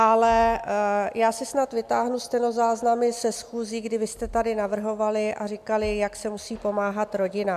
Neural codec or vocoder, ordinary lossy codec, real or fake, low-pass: none; MP3, 96 kbps; real; 14.4 kHz